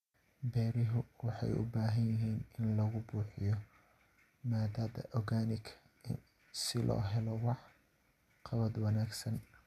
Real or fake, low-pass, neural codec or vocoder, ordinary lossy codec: real; 14.4 kHz; none; none